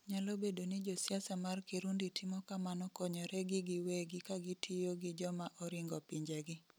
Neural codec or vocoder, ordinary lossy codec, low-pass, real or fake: none; none; none; real